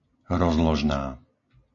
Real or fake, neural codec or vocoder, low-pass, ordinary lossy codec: real; none; 7.2 kHz; AAC, 48 kbps